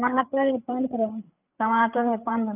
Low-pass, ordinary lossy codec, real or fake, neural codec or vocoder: 3.6 kHz; none; fake; codec, 16 kHz, 8 kbps, FreqCodec, larger model